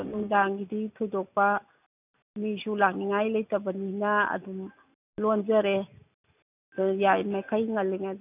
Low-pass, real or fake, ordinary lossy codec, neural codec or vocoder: 3.6 kHz; real; none; none